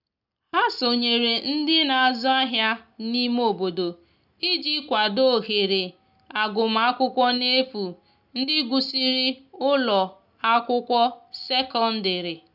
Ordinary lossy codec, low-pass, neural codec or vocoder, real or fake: none; 5.4 kHz; none; real